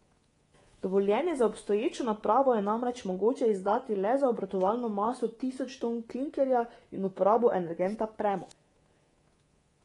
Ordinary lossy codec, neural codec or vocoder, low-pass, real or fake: AAC, 32 kbps; codec, 24 kHz, 3.1 kbps, DualCodec; 10.8 kHz; fake